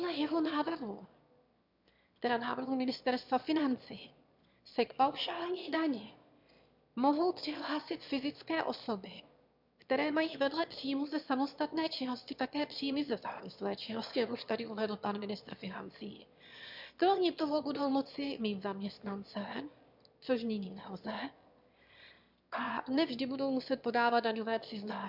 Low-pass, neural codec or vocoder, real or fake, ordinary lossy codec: 5.4 kHz; autoencoder, 22.05 kHz, a latent of 192 numbers a frame, VITS, trained on one speaker; fake; MP3, 48 kbps